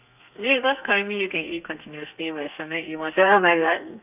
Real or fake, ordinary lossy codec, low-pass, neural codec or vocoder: fake; none; 3.6 kHz; codec, 32 kHz, 1.9 kbps, SNAC